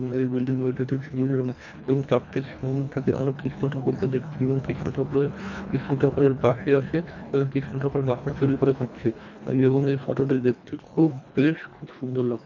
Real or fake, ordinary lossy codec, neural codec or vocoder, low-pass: fake; none; codec, 24 kHz, 1.5 kbps, HILCodec; 7.2 kHz